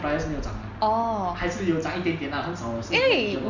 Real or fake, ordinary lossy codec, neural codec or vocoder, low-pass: real; Opus, 64 kbps; none; 7.2 kHz